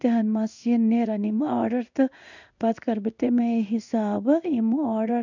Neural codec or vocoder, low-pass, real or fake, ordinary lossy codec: codec, 16 kHz in and 24 kHz out, 1 kbps, XY-Tokenizer; 7.2 kHz; fake; none